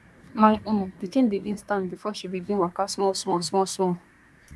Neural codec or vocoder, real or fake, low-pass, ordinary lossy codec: codec, 24 kHz, 1 kbps, SNAC; fake; none; none